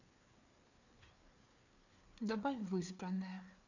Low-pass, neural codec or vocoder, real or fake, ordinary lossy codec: 7.2 kHz; codec, 16 kHz, 4 kbps, FreqCodec, larger model; fake; none